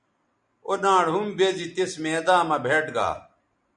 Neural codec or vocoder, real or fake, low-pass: none; real; 9.9 kHz